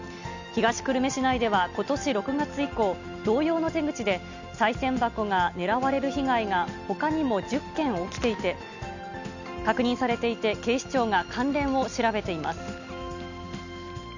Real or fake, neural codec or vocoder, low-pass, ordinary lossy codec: real; none; 7.2 kHz; none